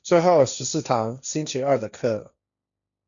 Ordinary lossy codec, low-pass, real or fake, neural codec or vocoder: MP3, 96 kbps; 7.2 kHz; fake; codec, 16 kHz, 1.1 kbps, Voila-Tokenizer